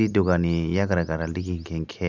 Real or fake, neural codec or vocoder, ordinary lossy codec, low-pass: real; none; none; 7.2 kHz